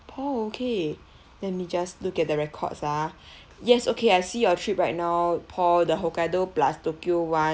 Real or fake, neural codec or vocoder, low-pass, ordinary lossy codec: real; none; none; none